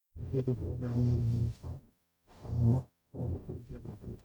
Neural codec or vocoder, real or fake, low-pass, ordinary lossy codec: codec, 44.1 kHz, 0.9 kbps, DAC; fake; 19.8 kHz; none